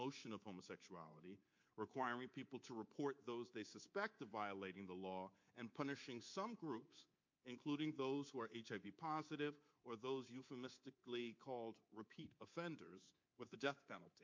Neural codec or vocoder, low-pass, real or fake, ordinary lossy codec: codec, 24 kHz, 3.1 kbps, DualCodec; 7.2 kHz; fake; MP3, 48 kbps